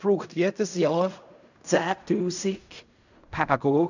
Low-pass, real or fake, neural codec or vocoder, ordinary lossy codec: 7.2 kHz; fake; codec, 16 kHz in and 24 kHz out, 0.4 kbps, LongCat-Audio-Codec, fine tuned four codebook decoder; none